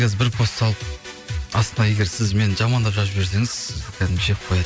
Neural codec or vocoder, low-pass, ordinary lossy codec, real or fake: none; none; none; real